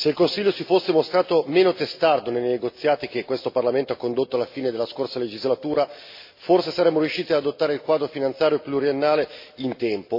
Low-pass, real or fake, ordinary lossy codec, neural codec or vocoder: 5.4 kHz; real; MP3, 32 kbps; none